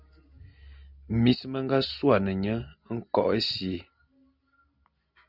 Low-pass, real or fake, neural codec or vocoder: 5.4 kHz; real; none